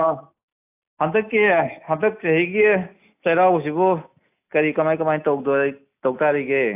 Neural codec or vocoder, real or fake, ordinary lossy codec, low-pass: none; real; AAC, 32 kbps; 3.6 kHz